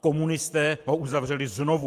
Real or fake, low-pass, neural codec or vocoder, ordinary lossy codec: fake; 14.4 kHz; vocoder, 44.1 kHz, 128 mel bands every 512 samples, BigVGAN v2; Opus, 32 kbps